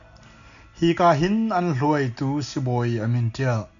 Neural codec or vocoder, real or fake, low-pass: none; real; 7.2 kHz